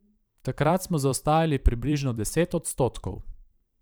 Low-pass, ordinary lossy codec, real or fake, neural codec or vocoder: none; none; fake; vocoder, 44.1 kHz, 128 mel bands every 512 samples, BigVGAN v2